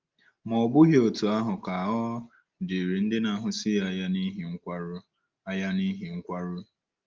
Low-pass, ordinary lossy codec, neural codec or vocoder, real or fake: 7.2 kHz; Opus, 32 kbps; none; real